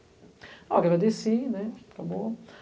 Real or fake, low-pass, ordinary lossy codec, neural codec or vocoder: real; none; none; none